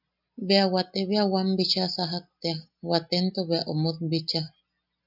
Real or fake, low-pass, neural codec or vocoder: real; 5.4 kHz; none